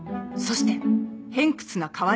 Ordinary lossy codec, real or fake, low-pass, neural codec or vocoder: none; real; none; none